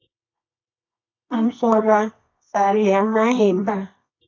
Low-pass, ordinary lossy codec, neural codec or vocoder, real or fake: 7.2 kHz; AAC, 48 kbps; codec, 24 kHz, 0.9 kbps, WavTokenizer, medium music audio release; fake